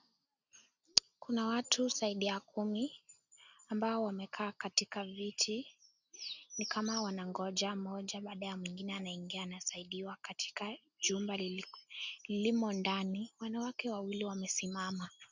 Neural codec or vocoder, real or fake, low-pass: none; real; 7.2 kHz